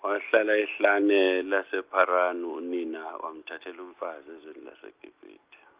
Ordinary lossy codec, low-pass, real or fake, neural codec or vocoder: Opus, 24 kbps; 3.6 kHz; real; none